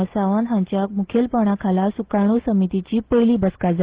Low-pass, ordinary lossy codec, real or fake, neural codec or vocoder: 3.6 kHz; Opus, 16 kbps; real; none